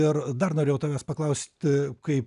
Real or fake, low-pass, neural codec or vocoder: real; 10.8 kHz; none